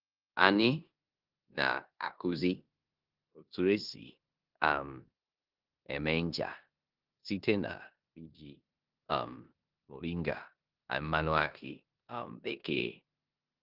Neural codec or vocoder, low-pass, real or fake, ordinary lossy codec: codec, 16 kHz in and 24 kHz out, 0.9 kbps, LongCat-Audio-Codec, four codebook decoder; 5.4 kHz; fake; Opus, 24 kbps